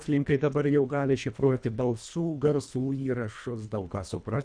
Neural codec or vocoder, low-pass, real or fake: codec, 24 kHz, 1.5 kbps, HILCodec; 9.9 kHz; fake